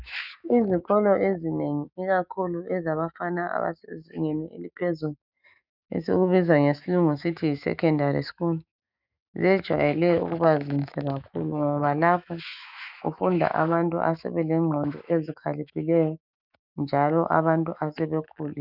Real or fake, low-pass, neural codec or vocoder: fake; 5.4 kHz; autoencoder, 48 kHz, 128 numbers a frame, DAC-VAE, trained on Japanese speech